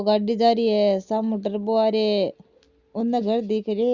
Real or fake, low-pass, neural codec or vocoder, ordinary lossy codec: real; 7.2 kHz; none; Opus, 64 kbps